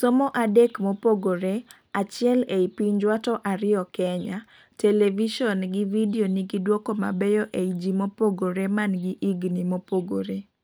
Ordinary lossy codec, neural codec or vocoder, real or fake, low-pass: none; none; real; none